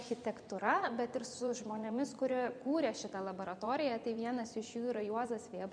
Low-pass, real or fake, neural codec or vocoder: 9.9 kHz; fake; vocoder, 24 kHz, 100 mel bands, Vocos